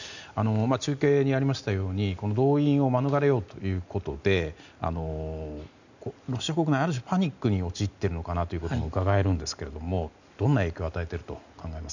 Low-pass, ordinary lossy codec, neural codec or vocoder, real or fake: 7.2 kHz; none; none; real